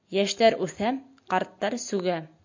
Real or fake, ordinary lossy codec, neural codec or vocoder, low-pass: real; MP3, 48 kbps; none; 7.2 kHz